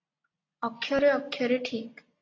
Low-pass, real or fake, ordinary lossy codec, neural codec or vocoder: 7.2 kHz; real; AAC, 32 kbps; none